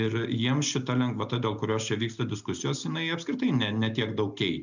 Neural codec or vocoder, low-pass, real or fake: none; 7.2 kHz; real